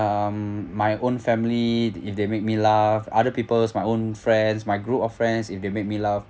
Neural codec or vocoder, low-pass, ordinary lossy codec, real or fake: none; none; none; real